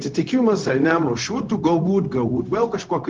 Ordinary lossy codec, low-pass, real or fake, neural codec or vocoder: Opus, 16 kbps; 7.2 kHz; fake; codec, 16 kHz, 0.4 kbps, LongCat-Audio-Codec